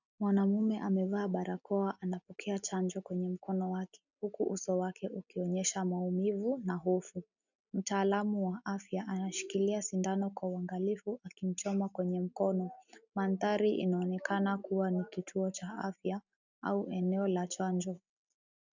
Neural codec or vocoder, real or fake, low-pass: none; real; 7.2 kHz